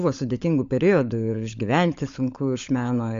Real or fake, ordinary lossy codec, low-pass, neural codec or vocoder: fake; MP3, 48 kbps; 7.2 kHz; codec, 16 kHz, 16 kbps, FunCodec, trained on LibriTTS, 50 frames a second